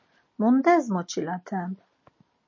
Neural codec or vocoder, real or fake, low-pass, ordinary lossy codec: none; real; 7.2 kHz; MP3, 32 kbps